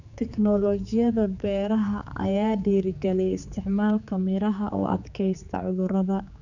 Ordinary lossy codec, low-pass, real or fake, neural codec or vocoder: none; 7.2 kHz; fake; codec, 16 kHz, 4 kbps, X-Codec, HuBERT features, trained on general audio